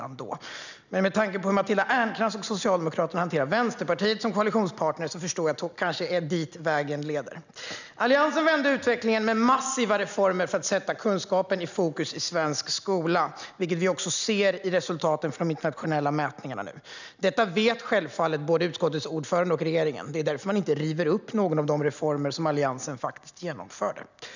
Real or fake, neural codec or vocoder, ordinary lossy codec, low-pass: real; none; none; 7.2 kHz